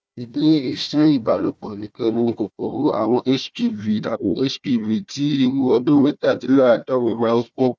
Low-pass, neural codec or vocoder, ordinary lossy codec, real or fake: none; codec, 16 kHz, 1 kbps, FunCodec, trained on Chinese and English, 50 frames a second; none; fake